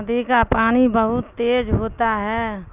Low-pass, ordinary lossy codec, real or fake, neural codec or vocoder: 3.6 kHz; none; real; none